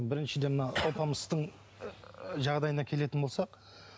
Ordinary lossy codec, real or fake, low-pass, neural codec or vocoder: none; real; none; none